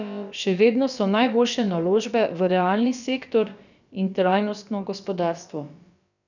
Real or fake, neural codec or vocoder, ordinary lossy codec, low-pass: fake; codec, 16 kHz, about 1 kbps, DyCAST, with the encoder's durations; none; 7.2 kHz